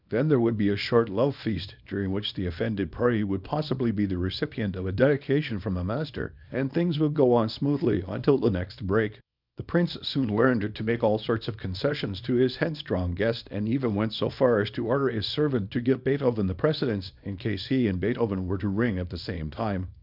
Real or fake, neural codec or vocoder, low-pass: fake; codec, 24 kHz, 0.9 kbps, WavTokenizer, small release; 5.4 kHz